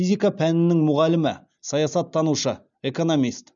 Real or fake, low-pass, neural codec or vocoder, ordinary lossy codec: real; 7.2 kHz; none; none